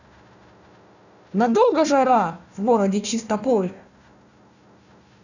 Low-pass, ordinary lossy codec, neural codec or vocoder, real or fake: 7.2 kHz; none; codec, 16 kHz, 1 kbps, FunCodec, trained on Chinese and English, 50 frames a second; fake